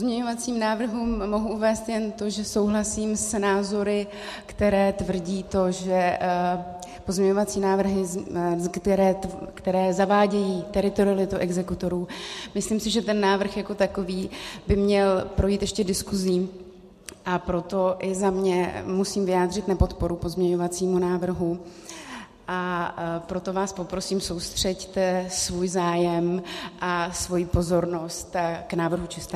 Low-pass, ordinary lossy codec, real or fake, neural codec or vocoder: 14.4 kHz; MP3, 64 kbps; real; none